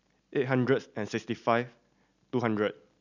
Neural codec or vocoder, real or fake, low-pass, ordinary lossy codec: none; real; 7.2 kHz; none